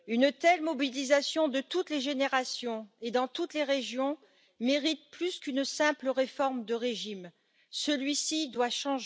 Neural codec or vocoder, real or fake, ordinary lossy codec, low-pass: none; real; none; none